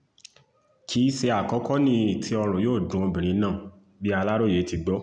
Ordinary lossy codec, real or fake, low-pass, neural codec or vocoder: MP3, 96 kbps; real; 9.9 kHz; none